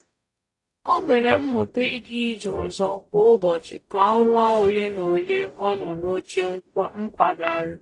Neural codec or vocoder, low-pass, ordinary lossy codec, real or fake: codec, 44.1 kHz, 0.9 kbps, DAC; 10.8 kHz; AAC, 48 kbps; fake